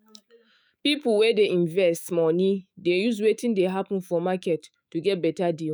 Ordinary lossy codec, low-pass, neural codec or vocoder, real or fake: none; none; autoencoder, 48 kHz, 128 numbers a frame, DAC-VAE, trained on Japanese speech; fake